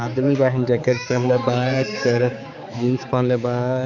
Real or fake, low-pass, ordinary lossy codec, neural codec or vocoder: fake; 7.2 kHz; none; codec, 16 kHz, 4 kbps, X-Codec, HuBERT features, trained on balanced general audio